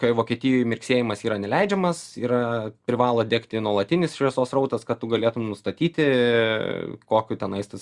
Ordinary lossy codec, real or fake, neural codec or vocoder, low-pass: Opus, 64 kbps; real; none; 10.8 kHz